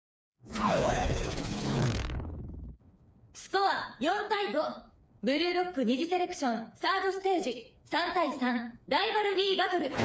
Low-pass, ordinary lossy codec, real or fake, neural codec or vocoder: none; none; fake; codec, 16 kHz, 4 kbps, FreqCodec, smaller model